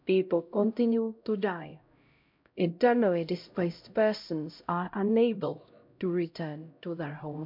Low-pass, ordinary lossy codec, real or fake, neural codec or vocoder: 5.4 kHz; MP3, 48 kbps; fake; codec, 16 kHz, 0.5 kbps, X-Codec, HuBERT features, trained on LibriSpeech